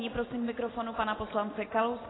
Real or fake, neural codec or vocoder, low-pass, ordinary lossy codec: real; none; 7.2 kHz; AAC, 16 kbps